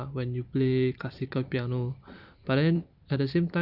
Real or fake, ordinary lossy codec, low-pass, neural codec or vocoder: fake; none; 5.4 kHz; vocoder, 44.1 kHz, 128 mel bands every 256 samples, BigVGAN v2